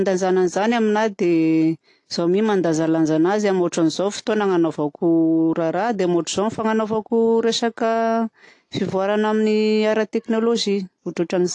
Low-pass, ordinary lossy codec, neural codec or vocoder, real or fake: 10.8 kHz; AAC, 48 kbps; none; real